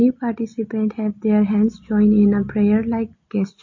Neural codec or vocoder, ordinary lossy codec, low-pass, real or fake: none; MP3, 32 kbps; 7.2 kHz; real